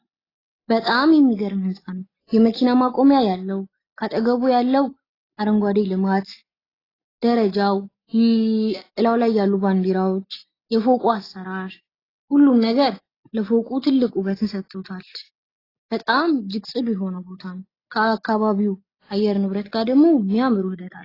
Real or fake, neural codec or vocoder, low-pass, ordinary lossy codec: real; none; 5.4 kHz; AAC, 24 kbps